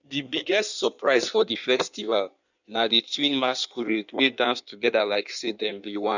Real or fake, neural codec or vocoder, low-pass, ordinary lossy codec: fake; codec, 16 kHz in and 24 kHz out, 1.1 kbps, FireRedTTS-2 codec; 7.2 kHz; none